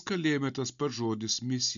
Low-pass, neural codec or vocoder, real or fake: 7.2 kHz; none; real